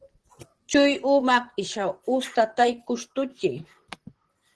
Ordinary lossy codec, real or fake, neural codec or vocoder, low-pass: Opus, 16 kbps; fake; vocoder, 44.1 kHz, 128 mel bands, Pupu-Vocoder; 10.8 kHz